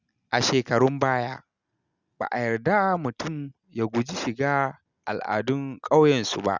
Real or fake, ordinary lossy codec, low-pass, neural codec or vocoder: real; Opus, 64 kbps; 7.2 kHz; none